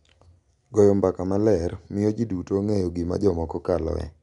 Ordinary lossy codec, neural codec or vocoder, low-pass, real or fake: none; none; 10.8 kHz; real